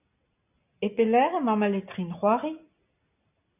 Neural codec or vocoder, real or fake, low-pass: none; real; 3.6 kHz